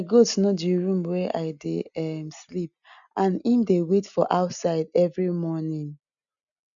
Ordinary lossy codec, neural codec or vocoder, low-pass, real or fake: none; none; 7.2 kHz; real